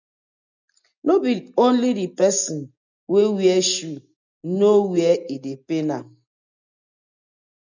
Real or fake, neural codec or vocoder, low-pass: real; none; 7.2 kHz